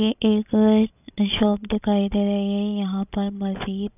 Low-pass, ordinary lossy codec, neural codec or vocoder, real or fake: 3.6 kHz; none; codec, 16 kHz, 16 kbps, FreqCodec, larger model; fake